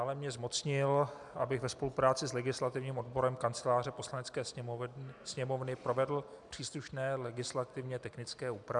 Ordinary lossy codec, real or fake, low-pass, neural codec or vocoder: MP3, 96 kbps; real; 10.8 kHz; none